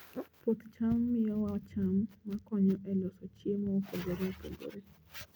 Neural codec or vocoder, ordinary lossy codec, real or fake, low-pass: none; none; real; none